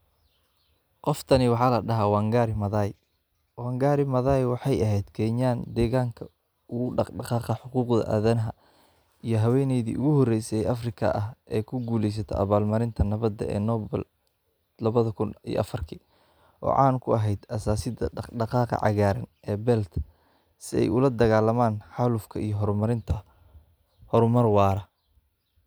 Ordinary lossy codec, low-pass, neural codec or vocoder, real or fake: none; none; none; real